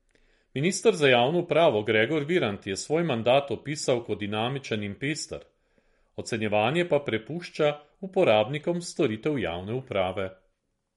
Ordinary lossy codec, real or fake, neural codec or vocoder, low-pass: MP3, 48 kbps; real; none; 19.8 kHz